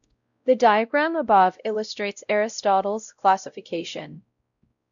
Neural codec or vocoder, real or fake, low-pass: codec, 16 kHz, 0.5 kbps, X-Codec, WavLM features, trained on Multilingual LibriSpeech; fake; 7.2 kHz